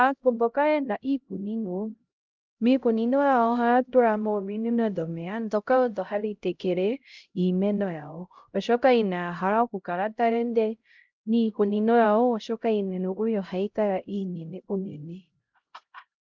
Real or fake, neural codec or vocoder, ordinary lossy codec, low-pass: fake; codec, 16 kHz, 0.5 kbps, X-Codec, HuBERT features, trained on LibriSpeech; Opus, 24 kbps; 7.2 kHz